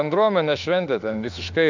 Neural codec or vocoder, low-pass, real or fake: autoencoder, 48 kHz, 32 numbers a frame, DAC-VAE, trained on Japanese speech; 7.2 kHz; fake